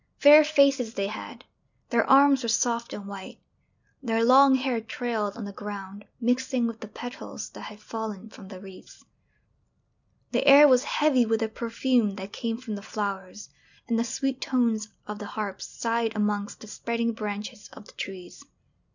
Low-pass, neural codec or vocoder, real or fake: 7.2 kHz; none; real